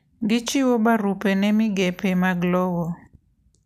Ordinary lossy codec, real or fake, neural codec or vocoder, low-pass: none; real; none; 14.4 kHz